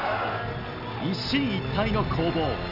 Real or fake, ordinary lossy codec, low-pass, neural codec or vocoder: real; none; 5.4 kHz; none